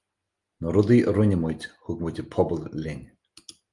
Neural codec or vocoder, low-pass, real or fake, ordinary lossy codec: none; 10.8 kHz; real; Opus, 32 kbps